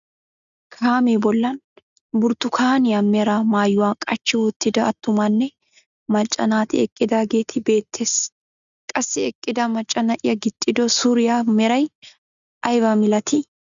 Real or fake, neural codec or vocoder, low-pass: real; none; 7.2 kHz